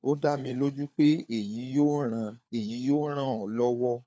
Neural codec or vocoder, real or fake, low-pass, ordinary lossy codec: codec, 16 kHz, 4 kbps, FunCodec, trained on LibriTTS, 50 frames a second; fake; none; none